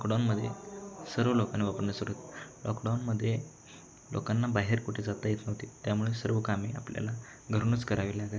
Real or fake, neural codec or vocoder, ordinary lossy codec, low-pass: real; none; none; none